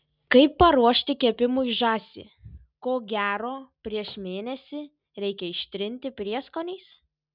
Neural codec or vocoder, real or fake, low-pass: none; real; 5.4 kHz